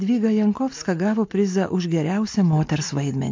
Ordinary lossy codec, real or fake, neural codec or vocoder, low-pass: MP3, 48 kbps; real; none; 7.2 kHz